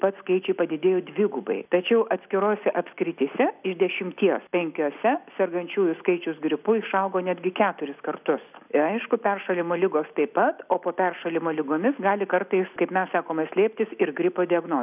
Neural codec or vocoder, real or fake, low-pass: none; real; 3.6 kHz